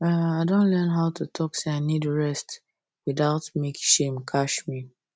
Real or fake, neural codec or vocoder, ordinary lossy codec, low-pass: real; none; none; none